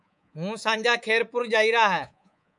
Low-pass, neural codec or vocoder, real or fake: 10.8 kHz; codec, 24 kHz, 3.1 kbps, DualCodec; fake